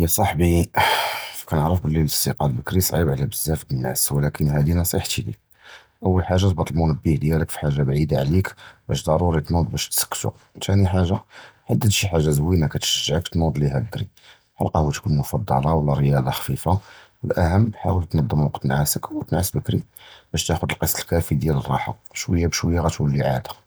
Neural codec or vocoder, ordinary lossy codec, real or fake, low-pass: none; none; real; none